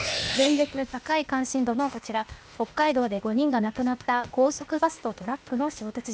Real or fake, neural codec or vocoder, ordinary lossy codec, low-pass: fake; codec, 16 kHz, 0.8 kbps, ZipCodec; none; none